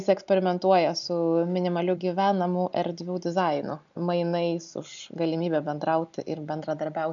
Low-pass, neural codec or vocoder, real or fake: 7.2 kHz; none; real